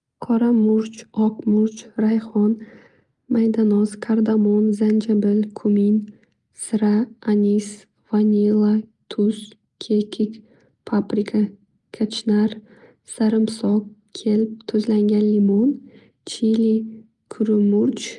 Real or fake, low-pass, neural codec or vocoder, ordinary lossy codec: real; 10.8 kHz; none; Opus, 32 kbps